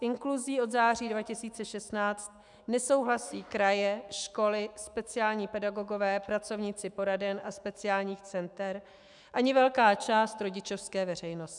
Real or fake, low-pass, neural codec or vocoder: fake; 10.8 kHz; autoencoder, 48 kHz, 128 numbers a frame, DAC-VAE, trained on Japanese speech